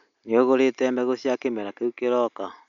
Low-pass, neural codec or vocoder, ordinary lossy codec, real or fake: 7.2 kHz; none; none; real